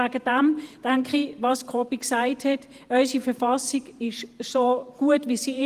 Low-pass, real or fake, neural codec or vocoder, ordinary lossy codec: 14.4 kHz; fake; vocoder, 48 kHz, 128 mel bands, Vocos; Opus, 24 kbps